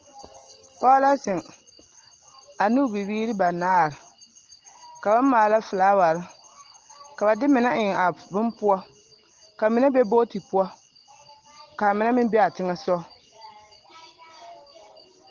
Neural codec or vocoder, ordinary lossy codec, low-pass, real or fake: none; Opus, 16 kbps; 7.2 kHz; real